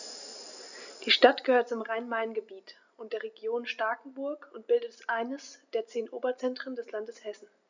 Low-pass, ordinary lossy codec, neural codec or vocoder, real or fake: 7.2 kHz; none; none; real